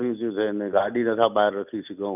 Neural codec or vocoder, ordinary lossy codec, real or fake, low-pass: none; none; real; 3.6 kHz